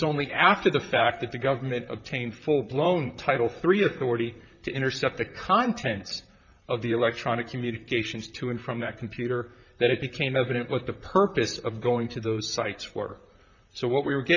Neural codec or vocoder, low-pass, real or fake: vocoder, 44.1 kHz, 128 mel bands, Pupu-Vocoder; 7.2 kHz; fake